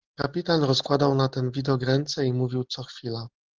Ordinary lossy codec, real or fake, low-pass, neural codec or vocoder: Opus, 16 kbps; real; 7.2 kHz; none